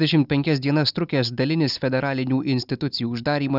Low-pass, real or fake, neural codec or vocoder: 5.4 kHz; real; none